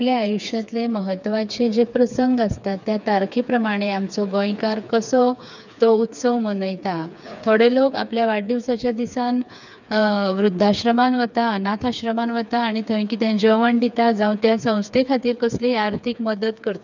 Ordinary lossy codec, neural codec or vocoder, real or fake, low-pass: none; codec, 16 kHz, 4 kbps, FreqCodec, smaller model; fake; 7.2 kHz